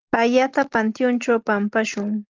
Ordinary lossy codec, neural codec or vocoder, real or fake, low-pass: Opus, 32 kbps; none; real; 7.2 kHz